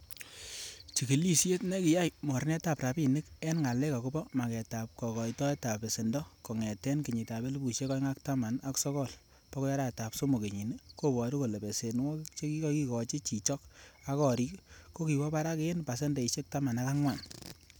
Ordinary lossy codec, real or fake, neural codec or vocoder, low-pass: none; real; none; none